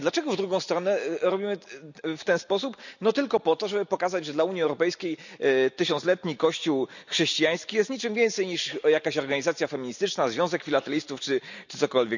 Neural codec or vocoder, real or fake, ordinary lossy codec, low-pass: none; real; none; 7.2 kHz